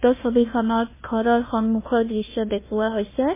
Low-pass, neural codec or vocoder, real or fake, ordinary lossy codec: 3.6 kHz; codec, 16 kHz, 1 kbps, FunCodec, trained on Chinese and English, 50 frames a second; fake; MP3, 16 kbps